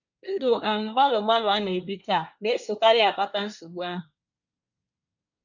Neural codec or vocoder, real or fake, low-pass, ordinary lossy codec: codec, 24 kHz, 1 kbps, SNAC; fake; 7.2 kHz; none